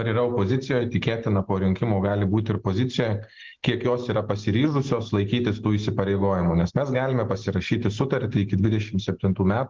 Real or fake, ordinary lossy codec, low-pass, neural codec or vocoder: real; Opus, 16 kbps; 7.2 kHz; none